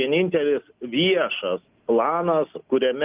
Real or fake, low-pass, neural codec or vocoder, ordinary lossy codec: real; 3.6 kHz; none; Opus, 24 kbps